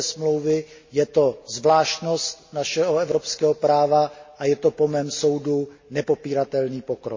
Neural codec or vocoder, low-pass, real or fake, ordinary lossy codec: none; 7.2 kHz; real; MP3, 32 kbps